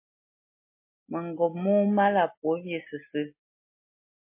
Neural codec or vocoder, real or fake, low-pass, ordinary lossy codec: none; real; 3.6 kHz; MP3, 24 kbps